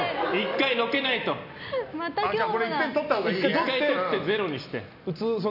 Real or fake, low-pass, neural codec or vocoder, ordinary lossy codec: real; 5.4 kHz; none; none